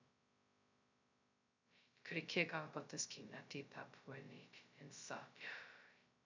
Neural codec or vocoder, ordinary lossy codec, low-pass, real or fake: codec, 16 kHz, 0.2 kbps, FocalCodec; MP3, 64 kbps; 7.2 kHz; fake